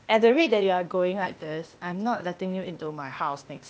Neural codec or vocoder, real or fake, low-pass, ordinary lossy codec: codec, 16 kHz, 0.8 kbps, ZipCodec; fake; none; none